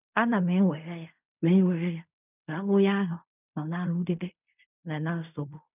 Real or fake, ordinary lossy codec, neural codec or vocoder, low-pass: fake; none; codec, 16 kHz in and 24 kHz out, 0.4 kbps, LongCat-Audio-Codec, fine tuned four codebook decoder; 3.6 kHz